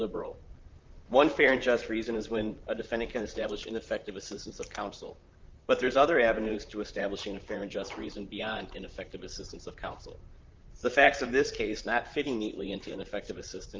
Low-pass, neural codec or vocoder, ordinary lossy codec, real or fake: 7.2 kHz; vocoder, 44.1 kHz, 128 mel bands, Pupu-Vocoder; Opus, 24 kbps; fake